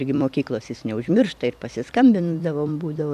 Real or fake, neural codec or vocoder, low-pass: fake; vocoder, 44.1 kHz, 128 mel bands every 256 samples, BigVGAN v2; 14.4 kHz